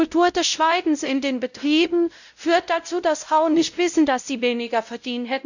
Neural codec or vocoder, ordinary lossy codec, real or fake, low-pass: codec, 16 kHz, 0.5 kbps, X-Codec, WavLM features, trained on Multilingual LibriSpeech; none; fake; 7.2 kHz